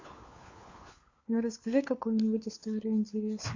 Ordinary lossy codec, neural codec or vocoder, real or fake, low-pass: AAC, 48 kbps; codec, 16 kHz, 4 kbps, FunCodec, trained on LibriTTS, 50 frames a second; fake; 7.2 kHz